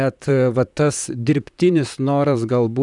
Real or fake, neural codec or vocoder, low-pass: fake; vocoder, 44.1 kHz, 128 mel bands, Pupu-Vocoder; 10.8 kHz